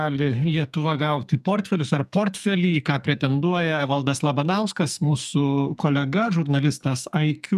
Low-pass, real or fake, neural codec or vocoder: 14.4 kHz; fake; codec, 44.1 kHz, 2.6 kbps, SNAC